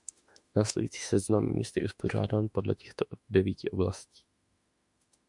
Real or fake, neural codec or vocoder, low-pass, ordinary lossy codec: fake; autoencoder, 48 kHz, 32 numbers a frame, DAC-VAE, trained on Japanese speech; 10.8 kHz; MP3, 96 kbps